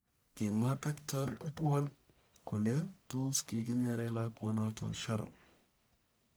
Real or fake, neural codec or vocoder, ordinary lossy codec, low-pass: fake; codec, 44.1 kHz, 1.7 kbps, Pupu-Codec; none; none